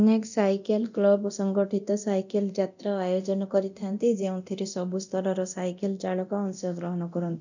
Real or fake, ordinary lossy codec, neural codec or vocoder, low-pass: fake; none; codec, 24 kHz, 0.9 kbps, DualCodec; 7.2 kHz